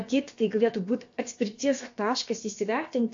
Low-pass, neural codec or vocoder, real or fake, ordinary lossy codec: 7.2 kHz; codec, 16 kHz, about 1 kbps, DyCAST, with the encoder's durations; fake; AAC, 48 kbps